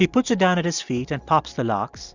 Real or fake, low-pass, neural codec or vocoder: real; 7.2 kHz; none